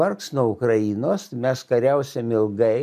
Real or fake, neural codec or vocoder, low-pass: real; none; 14.4 kHz